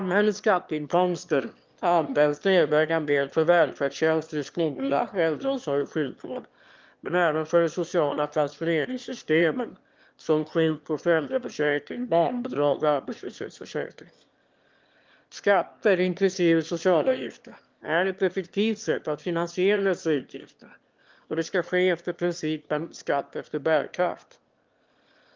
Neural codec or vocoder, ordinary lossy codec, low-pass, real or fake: autoencoder, 22.05 kHz, a latent of 192 numbers a frame, VITS, trained on one speaker; Opus, 32 kbps; 7.2 kHz; fake